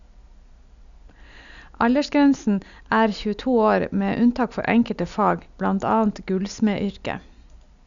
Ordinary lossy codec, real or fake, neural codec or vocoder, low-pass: none; real; none; 7.2 kHz